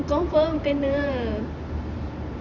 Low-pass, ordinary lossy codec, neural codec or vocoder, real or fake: 7.2 kHz; Opus, 64 kbps; vocoder, 44.1 kHz, 128 mel bands every 512 samples, BigVGAN v2; fake